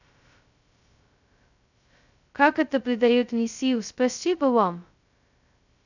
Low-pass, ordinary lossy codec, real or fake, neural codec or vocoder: 7.2 kHz; none; fake; codec, 16 kHz, 0.2 kbps, FocalCodec